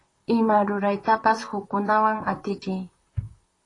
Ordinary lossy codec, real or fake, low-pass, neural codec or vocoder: AAC, 32 kbps; fake; 10.8 kHz; vocoder, 44.1 kHz, 128 mel bands, Pupu-Vocoder